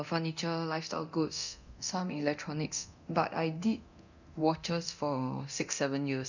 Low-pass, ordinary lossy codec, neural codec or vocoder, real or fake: 7.2 kHz; none; codec, 24 kHz, 0.9 kbps, DualCodec; fake